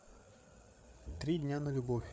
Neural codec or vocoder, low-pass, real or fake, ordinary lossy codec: codec, 16 kHz, 8 kbps, FreqCodec, larger model; none; fake; none